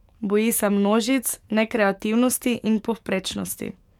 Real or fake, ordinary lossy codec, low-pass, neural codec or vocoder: fake; MP3, 96 kbps; 19.8 kHz; codec, 44.1 kHz, 7.8 kbps, DAC